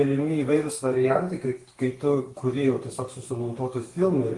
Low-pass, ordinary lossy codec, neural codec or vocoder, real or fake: 10.8 kHz; Opus, 24 kbps; codec, 32 kHz, 1.9 kbps, SNAC; fake